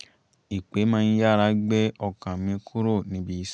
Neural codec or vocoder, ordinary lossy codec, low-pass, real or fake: none; none; 9.9 kHz; real